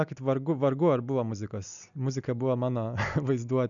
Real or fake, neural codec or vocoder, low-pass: real; none; 7.2 kHz